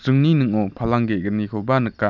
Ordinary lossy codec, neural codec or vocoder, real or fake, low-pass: none; none; real; 7.2 kHz